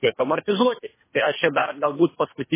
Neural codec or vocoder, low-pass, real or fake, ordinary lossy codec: codec, 24 kHz, 3 kbps, HILCodec; 3.6 kHz; fake; MP3, 16 kbps